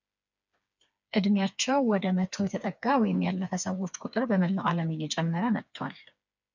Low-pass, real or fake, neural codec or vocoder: 7.2 kHz; fake; codec, 16 kHz, 4 kbps, FreqCodec, smaller model